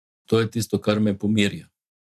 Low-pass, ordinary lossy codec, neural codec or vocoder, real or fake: 14.4 kHz; none; vocoder, 44.1 kHz, 128 mel bands every 512 samples, BigVGAN v2; fake